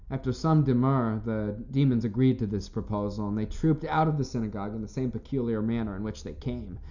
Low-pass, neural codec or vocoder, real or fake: 7.2 kHz; none; real